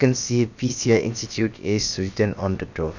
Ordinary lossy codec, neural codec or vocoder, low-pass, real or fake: none; codec, 16 kHz, about 1 kbps, DyCAST, with the encoder's durations; 7.2 kHz; fake